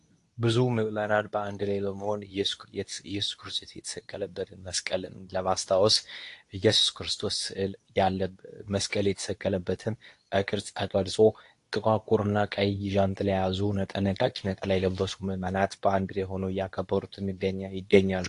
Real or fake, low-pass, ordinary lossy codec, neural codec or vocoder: fake; 10.8 kHz; AAC, 48 kbps; codec, 24 kHz, 0.9 kbps, WavTokenizer, medium speech release version 2